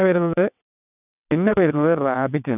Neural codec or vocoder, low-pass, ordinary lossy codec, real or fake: vocoder, 22.05 kHz, 80 mel bands, WaveNeXt; 3.6 kHz; none; fake